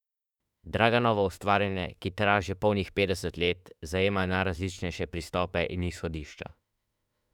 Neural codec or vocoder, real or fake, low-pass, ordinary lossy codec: autoencoder, 48 kHz, 32 numbers a frame, DAC-VAE, trained on Japanese speech; fake; 19.8 kHz; none